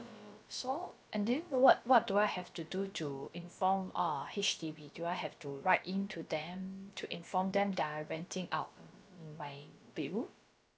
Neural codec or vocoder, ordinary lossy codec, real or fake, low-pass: codec, 16 kHz, about 1 kbps, DyCAST, with the encoder's durations; none; fake; none